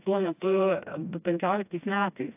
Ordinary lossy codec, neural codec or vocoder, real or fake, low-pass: AAC, 32 kbps; codec, 16 kHz, 1 kbps, FreqCodec, smaller model; fake; 3.6 kHz